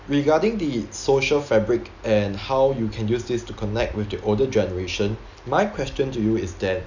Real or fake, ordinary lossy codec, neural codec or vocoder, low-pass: real; none; none; 7.2 kHz